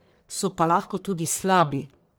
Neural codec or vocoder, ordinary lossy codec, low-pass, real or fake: codec, 44.1 kHz, 1.7 kbps, Pupu-Codec; none; none; fake